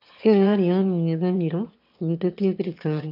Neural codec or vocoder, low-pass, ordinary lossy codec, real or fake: autoencoder, 22.05 kHz, a latent of 192 numbers a frame, VITS, trained on one speaker; 5.4 kHz; none; fake